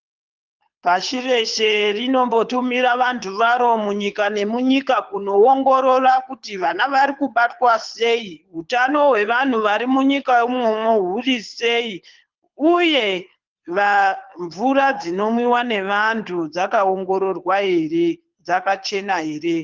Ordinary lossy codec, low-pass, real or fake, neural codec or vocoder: Opus, 32 kbps; 7.2 kHz; fake; codec, 24 kHz, 6 kbps, HILCodec